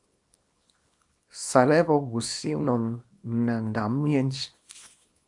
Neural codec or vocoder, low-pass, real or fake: codec, 24 kHz, 0.9 kbps, WavTokenizer, small release; 10.8 kHz; fake